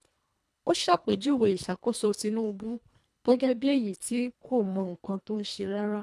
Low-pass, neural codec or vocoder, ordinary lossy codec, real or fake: none; codec, 24 kHz, 1.5 kbps, HILCodec; none; fake